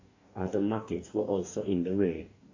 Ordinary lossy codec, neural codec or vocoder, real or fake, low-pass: AAC, 48 kbps; codec, 44.1 kHz, 2.6 kbps, DAC; fake; 7.2 kHz